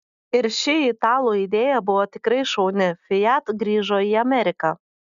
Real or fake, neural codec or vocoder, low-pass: real; none; 7.2 kHz